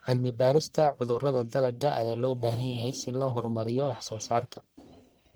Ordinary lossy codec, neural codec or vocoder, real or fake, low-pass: none; codec, 44.1 kHz, 1.7 kbps, Pupu-Codec; fake; none